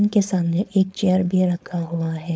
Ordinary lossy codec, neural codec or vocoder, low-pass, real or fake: none; codec, 16 kHz, 4.8 kbps, FACodec; none; fake